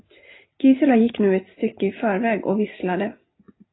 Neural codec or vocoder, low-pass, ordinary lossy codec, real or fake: none; 7.2 kHz; AAC, 16 kbps; real